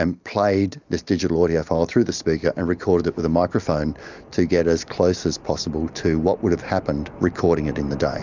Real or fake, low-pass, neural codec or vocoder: real; 7.2 kHz; none